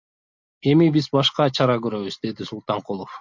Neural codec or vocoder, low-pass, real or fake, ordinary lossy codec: none; 7.2 kHz; real; MP3, 48 kbps